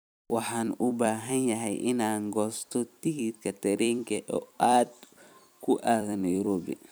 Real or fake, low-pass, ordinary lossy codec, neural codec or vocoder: fake; none; none; vocoder, 44.1 kHz, 128 mel bands every 256 samples, BigVGAN v2